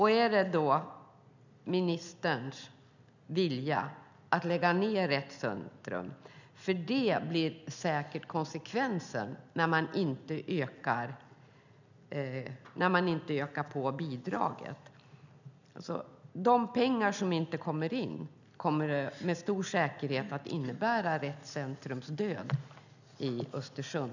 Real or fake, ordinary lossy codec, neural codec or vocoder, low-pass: real; none; none; 7.2 kHz